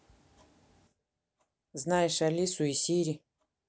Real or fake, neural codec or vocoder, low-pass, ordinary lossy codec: real; none; none; none